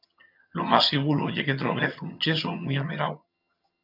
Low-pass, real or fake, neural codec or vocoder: 5.4 kHz; fake; vocoder, 22.05 kHz, 80 mel bands, HiFi-GAN